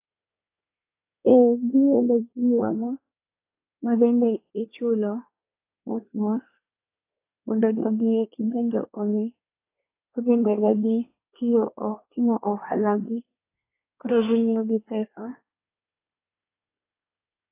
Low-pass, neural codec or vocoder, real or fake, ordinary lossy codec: 3.6 kHz; codec, 24 kHz, 1 kbps, SNAC; fake; AAC, 24 kbps